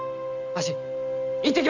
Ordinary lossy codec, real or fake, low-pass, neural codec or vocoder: none; real; 7.2 kHz; none